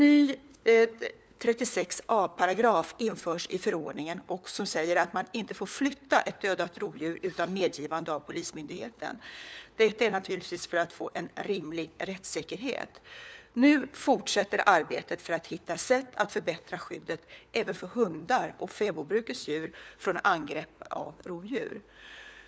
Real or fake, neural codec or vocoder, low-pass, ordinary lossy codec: fake; codec, 16 kHz, 4 kbps, FunCodec, trained on LibriTTS, 50 frames a second; none; none